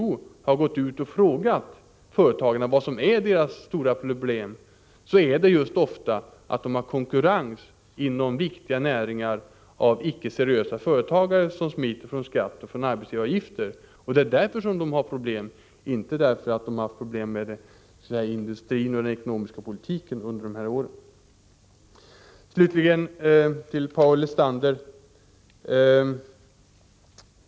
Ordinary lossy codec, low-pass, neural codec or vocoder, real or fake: none; none; none; real